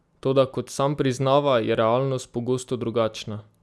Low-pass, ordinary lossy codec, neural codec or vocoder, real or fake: none; none; none; real